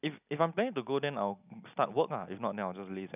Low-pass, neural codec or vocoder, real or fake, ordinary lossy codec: 3.6 kHz; none; real; none